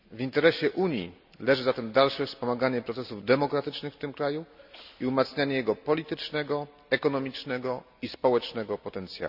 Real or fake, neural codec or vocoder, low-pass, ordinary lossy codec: real; none; 5.4 kHz; none